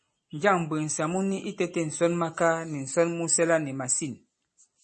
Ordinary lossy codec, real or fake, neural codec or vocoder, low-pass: MP3, 32 kbps; real; none; 10.8 kHz